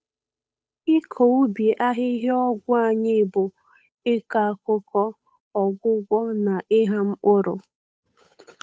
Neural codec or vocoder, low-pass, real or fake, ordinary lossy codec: codec, 16 kHz, 8 kbps, FunCodec, trained on Chinese and English, 25 frames a second; none; fake; none